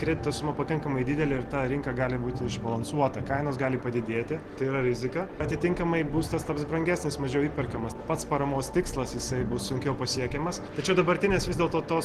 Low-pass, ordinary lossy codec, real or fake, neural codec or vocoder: 14.4 kHz; Opus, 16 kbps; real; none